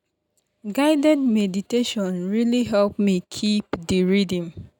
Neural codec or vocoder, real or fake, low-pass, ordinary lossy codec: none; real; 19.8 kHz; none